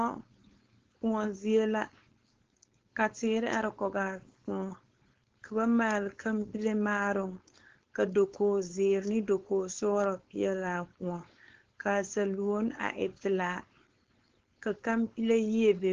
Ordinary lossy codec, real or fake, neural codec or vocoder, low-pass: Opus, 16 kbps; fake; codec, 16 kHz, 4.8 kbps, FACodec; 7.2 kHz